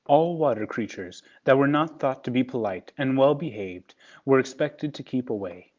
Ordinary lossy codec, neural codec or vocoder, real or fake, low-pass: Opus, 32 kbps; none; real; 7.2 kHz